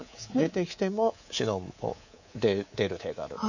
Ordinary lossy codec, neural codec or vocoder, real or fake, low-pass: none; codec, 24 kHz, 3.1 kbps, DualCodec; fake; 7.2 kHz